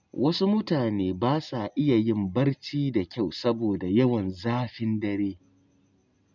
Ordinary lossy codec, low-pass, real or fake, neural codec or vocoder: none; 7.2 kHz; real; none